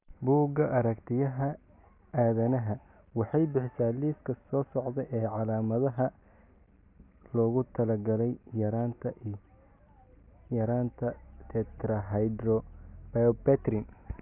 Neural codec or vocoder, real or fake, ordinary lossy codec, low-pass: none; real; AAC, 32 kbps; 3.6 kHz